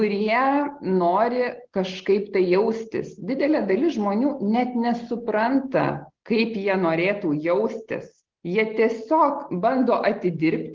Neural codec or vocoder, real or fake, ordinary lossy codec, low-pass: none; real; Opus, 16 kbps; 7.2 kHz